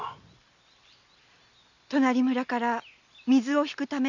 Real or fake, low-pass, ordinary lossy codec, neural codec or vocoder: real; 7.2 kHz; none; none